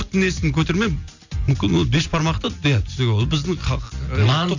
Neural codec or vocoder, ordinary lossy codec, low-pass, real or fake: none; none; 7.2 kHz; real